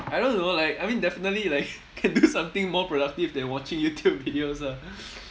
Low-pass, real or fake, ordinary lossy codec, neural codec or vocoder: none; real; none; none